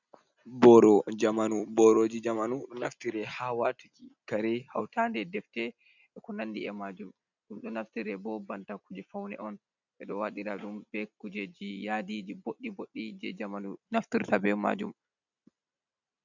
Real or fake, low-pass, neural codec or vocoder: real; 7.2 kHz; none